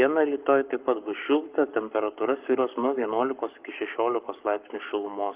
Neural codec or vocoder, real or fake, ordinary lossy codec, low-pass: codec, 44.1 kHz, 7.8 kbps, Pupu-Codec; fake; Opus, 32 kbps; 3.6 kHz